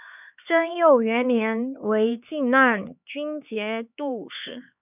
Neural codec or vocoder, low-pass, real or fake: codec, 16 kHz, 4 kbps, X-Codec, HuBERT features, trained on LibriSpeech; 3.6 kHz; fake